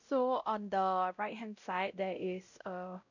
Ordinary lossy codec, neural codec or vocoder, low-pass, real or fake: none; codec, 16 kHz, 0.5 kbps, X-Codec, WavLM features, trained on Multilingual LibriSpeech; 7.2 kHz; fake